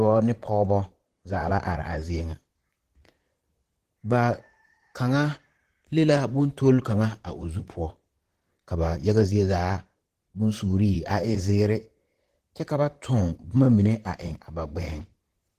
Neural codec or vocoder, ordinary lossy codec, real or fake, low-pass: vocoder, 44.1 kHz, 128 mel bands, Pupu-Vocoder; Opus, 16 kbps; fake; 14.4 kHz